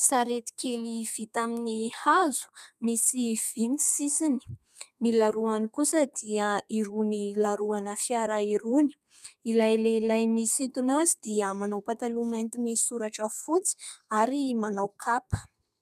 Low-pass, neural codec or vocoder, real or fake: 14.4 kHz; codec, 32 kHz, 1.9 kbps, SNAC; fake